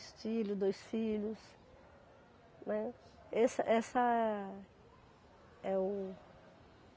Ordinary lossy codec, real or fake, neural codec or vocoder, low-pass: none; real; none; none